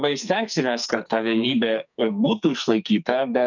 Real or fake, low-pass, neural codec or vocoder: fake; 7.2 kHz; codec, 44.1 kHz, 2.6 kbps, SNAC